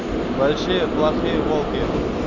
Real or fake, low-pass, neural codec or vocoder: real; 7.2 kHz; none